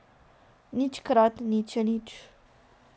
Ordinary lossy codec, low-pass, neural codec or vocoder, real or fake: none; none; none; real